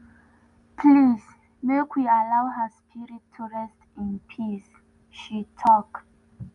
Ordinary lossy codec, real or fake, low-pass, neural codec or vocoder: none; real; 10.8 kHz; none